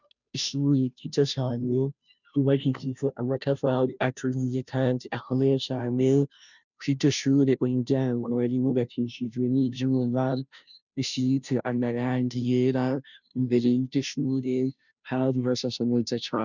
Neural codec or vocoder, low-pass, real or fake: codec, 16 kHz, 0.5 kbps, FunCodec, trained on Chinese and English, 25 frames a second; 7.2 kHz; fake